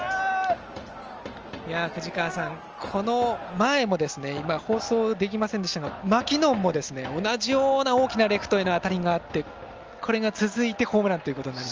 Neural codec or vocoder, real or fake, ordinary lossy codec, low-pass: none; real; Opus, 24 kbps; 7.2 kHz